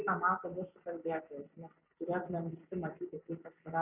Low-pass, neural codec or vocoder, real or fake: 3.6 kHz; none; real